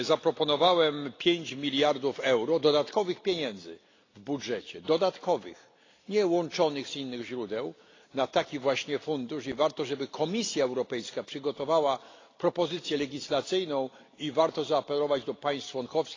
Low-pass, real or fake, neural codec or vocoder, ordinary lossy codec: 7.2 kHz; real; none; AAC, 32 kbps